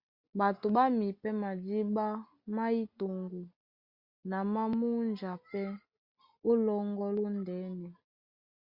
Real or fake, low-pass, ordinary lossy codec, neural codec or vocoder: real; 5.4 kHz; Opus, 64 kbps; none